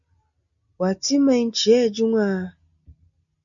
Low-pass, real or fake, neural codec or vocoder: 7.2 kHz; real; none